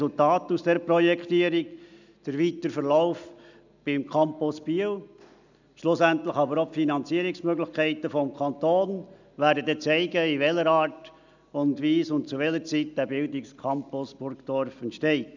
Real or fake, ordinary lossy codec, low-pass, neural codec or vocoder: real; none; 7.2 kHz; none